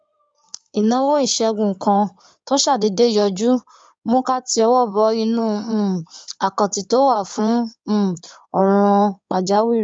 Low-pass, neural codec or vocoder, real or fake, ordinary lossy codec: 9.9 kHz; codec, 16 kHz in and 24 kHz out, 2.2 kbps, FireRedTTS-2 codec; fake; none